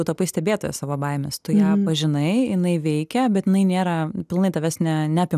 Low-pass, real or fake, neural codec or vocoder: 14.4 kHz; real; none